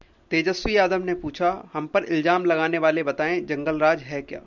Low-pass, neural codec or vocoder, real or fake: 7.2 kHz; none; real